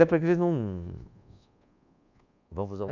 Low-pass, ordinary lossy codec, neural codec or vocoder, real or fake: 7.2 kHz; none; codec, 24 kHz, 1.2 kbps, DualCodec; fake